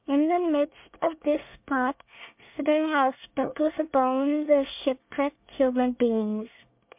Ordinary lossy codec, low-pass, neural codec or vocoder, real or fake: MP3, 32 kbps; 3.6 kHz; codec, 24 kHz, 1 kbps, SNAC; fake